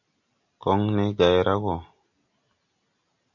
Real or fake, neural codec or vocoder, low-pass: real; none; 7.2 kHz